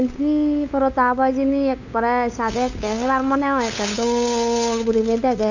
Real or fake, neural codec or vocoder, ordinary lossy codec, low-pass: fake; codec, 16 kHz, 8 kbps, FunCodec, trained on LibriTTS, 25 frames a second; none; 7.2 kHz